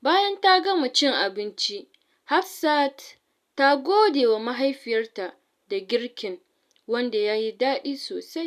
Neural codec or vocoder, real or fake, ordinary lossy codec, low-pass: none; real; none; 14.4 kHz